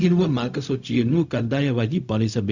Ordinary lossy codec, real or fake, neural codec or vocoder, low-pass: none; fake; codec, 16 kHz, 0.4 kbps, LongCat-Audio-Codec; 7.2 kHz